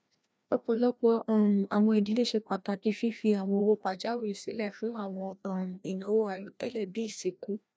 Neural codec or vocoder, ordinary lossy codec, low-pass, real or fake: codec, 16 kHz, 1 kbps, FreqCodec, larger model; none; none; fake